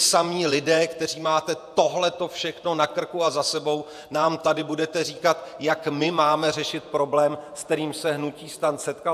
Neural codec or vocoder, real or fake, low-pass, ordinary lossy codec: vocoder, 48 kHz, 128 mel bands, Vocos; fake; 14.4 kHz; AAC, 96 kbps